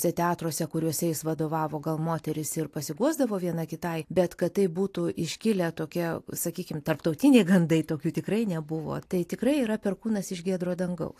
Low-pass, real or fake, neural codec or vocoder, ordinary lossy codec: 14.4 kHz; real; none; AAC, 64 kbps